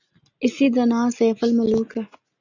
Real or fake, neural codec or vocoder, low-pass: real; none; 7.2 kHz